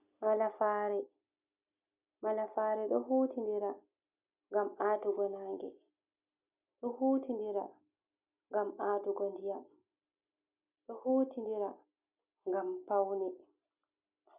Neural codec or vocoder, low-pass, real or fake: none; 3.6 kHz; real